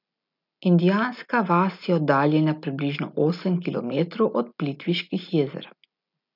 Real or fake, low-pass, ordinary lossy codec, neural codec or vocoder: real; 5.4 kHz; none; none